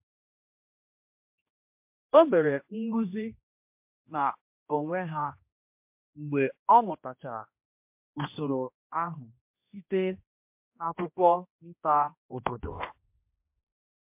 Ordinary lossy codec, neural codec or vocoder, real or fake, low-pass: MP3, 32 kbps; codec, 16 kHz, 1 kbps, X-Codec, HuBERT features, trained on general audio; fake; 3.6 kHz